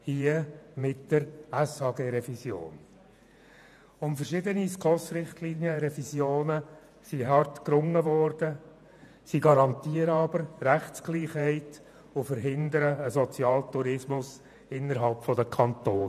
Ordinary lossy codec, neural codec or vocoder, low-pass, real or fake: none; vocoder, 48 kHz, 128 mel bands, Vocos; 14.4 kHz; fake